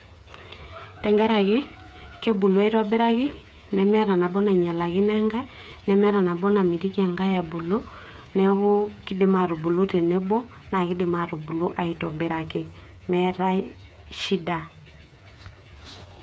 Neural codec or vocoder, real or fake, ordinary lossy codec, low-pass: codec, 16 kHz, 8 kbps, FreqCodec, smaller model; fake; none; none